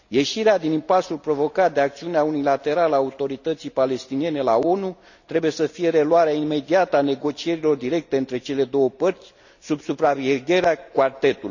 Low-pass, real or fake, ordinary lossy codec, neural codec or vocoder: 7.2 kHz; real; none; none